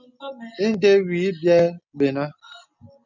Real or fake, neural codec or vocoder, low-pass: real; none; 7.2 kHz